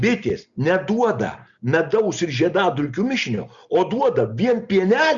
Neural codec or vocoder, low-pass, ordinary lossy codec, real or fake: none; 7.2 kHz; Opus, 64 kbps; real